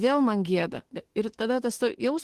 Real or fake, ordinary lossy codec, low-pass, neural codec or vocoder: fake; Opus, 16 kbps; 14.4 kHz; autoencoder, 48 kHz, 32 numbers a frame, DAC-VAE, trained on Japanese speech